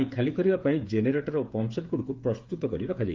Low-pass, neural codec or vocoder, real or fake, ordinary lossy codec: 7.2 kHz; codec, 16 kHz, 8 kbps, FreqCodec, smaller model; fake; Opus, 32 kbps